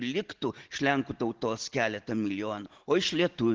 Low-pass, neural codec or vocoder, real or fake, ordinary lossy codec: 7.2 kHz; none; real; Opus, 16 kbps